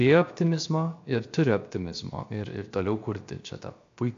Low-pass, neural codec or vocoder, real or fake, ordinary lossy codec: 7.2 kHz; codec, 16 kHz, 0.7 kbps, FocalCodec; fake; AAC, 48 kbps